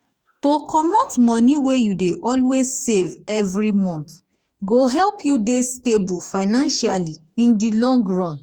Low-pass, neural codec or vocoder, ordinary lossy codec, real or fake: 19.8 kHz; codec, 44.1 kHz, 2.6 kbps, DAC; Opus, 64 kbps; fake